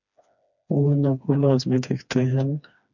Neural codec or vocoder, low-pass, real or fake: codec, 16 kHz, 2 kbps, FreqCodec, smaller model; 7.2 kHz; fake